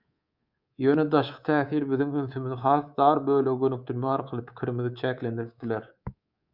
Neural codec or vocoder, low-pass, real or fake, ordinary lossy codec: codec, 24 kHz, 3.1 kbps, DualCodec; 5.4 kHz; fake; Opus, 64 kbps